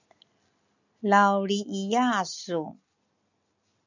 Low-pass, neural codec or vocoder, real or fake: 7.2 kHz; none; real